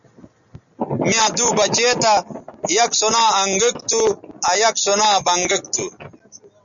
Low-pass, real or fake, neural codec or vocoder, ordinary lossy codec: 7.2 kHz; real; none; MP3, 96 kbps